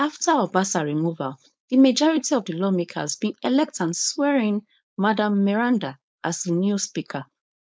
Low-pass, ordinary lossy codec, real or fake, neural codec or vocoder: none; none; fake; codec, 16 kHz, 4.8 kbps, FACodec